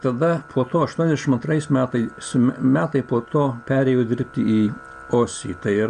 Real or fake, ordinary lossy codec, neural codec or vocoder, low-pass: real; AAC, 96 kbps; none; 9.9 kHz